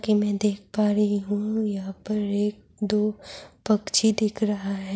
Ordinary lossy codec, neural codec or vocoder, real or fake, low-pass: none; none; real; none